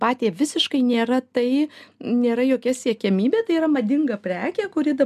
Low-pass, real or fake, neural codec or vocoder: 14.4 kHz; real; none